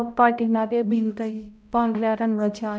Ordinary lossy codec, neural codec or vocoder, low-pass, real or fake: none; codec, 16 kHz, 0.5 kbps, X-Codec, HuBERT features, trained on balanced general audio; none; fake